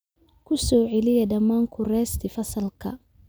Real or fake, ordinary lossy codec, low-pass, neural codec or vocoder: real; none; none; none